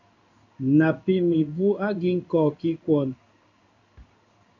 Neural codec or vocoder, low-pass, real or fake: codec, 16 kHz in and 24 kHz out, 1 kbps, XY-Tokenizer; 7.2 kHz; fake